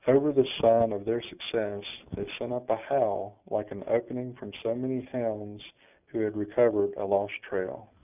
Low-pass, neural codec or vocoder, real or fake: 3.6 kHz; none; real